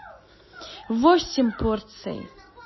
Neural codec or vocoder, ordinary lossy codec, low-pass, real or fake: none; MP3, 24 kbps; 7.2 kHz; real